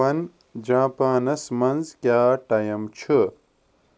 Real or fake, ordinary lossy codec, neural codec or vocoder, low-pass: real; none; none; none